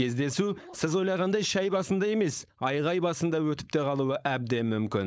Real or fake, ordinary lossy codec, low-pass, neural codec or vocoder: fake; none; none; codec, 16 kHz, 4.8 kbps, FACodec